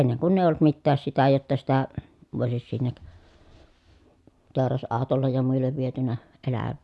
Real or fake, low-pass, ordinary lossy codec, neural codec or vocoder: real; none; none; none